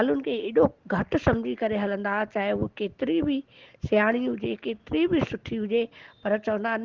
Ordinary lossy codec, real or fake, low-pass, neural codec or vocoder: Opus, 16 kbps; real; 7.2 kHz; none